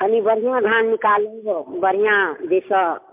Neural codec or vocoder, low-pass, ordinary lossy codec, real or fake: none; 3.6 kHz; MP3, 32 kbps; real